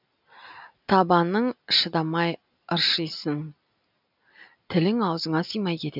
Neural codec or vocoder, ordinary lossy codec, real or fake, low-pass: none; none; real; 5.4 kHz